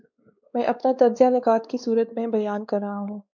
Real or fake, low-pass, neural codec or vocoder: fake; 7.2 kHz; codec, 16 kHz, 2 kbps, X-Codec, WavLM features, trained on Multilingual LibriSpeech